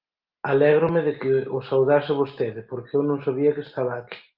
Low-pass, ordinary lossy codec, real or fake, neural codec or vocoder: 5.4 kHz; Opus, 32 kbps; real; none